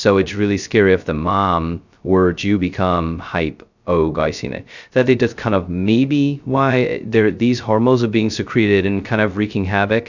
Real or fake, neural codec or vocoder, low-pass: fake; codec, 16 kHz, 0.2 kbps, FocalCodec; 7.2 kHz